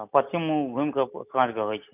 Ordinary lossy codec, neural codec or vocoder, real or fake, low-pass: none; none; real; 3.6 kHz